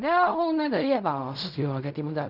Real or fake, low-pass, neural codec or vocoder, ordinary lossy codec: fake; 5.4 kHz; codec, 16 kHz in and 24 kHz out, 0.4 kbps, LongCat-Audio-Codec, fine tuned four codebook decoder; none